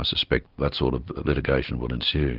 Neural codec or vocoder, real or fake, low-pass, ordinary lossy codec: none; real; 5.4 kHz; Opus, 32 kbps